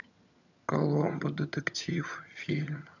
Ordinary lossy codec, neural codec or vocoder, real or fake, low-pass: none; vocoder, 22.05 kHz, 80 mel bands, HiFi-GAN; fake; 7.2 kHz